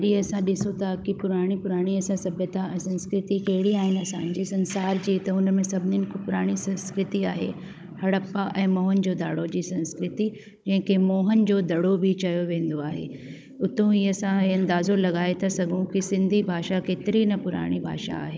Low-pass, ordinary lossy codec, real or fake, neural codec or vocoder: none; none; fake; codec, 16 kHz, 16 kbps, FunCodec, trained on Chinese and English, 50 frames a second